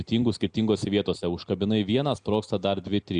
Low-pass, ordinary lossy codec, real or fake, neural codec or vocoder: 9.9 kHz; Opus, 64 kbps; real; none